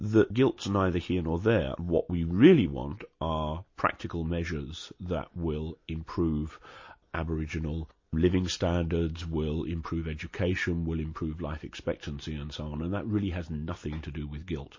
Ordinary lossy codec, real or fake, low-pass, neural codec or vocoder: MP3, 32 kbps; real; 7.2 kHz; none